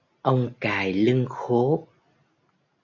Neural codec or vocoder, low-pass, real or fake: none; 7.2 kHz; real